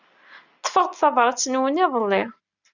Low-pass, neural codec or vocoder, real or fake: 7.2 kHz; none; real